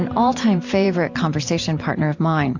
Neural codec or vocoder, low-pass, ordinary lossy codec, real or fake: vocoder, 44.1 kHz, 128 mel bands every 512 samples, BigVGAN v2; 7.2 kHz; AAC, 48 kbps; fake